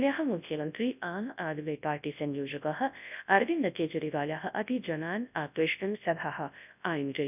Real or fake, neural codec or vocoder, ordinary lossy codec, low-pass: fake; codec, 24 kHz, 0.9 kbps, WavTokenizer, large speech release; none; 3.6 kHz